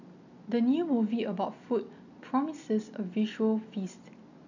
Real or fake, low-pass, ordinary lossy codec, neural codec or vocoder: real; 7.2 kHz; none; none